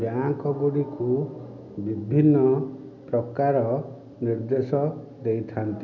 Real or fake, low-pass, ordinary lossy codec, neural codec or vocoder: real; 7.2 kHz; none; none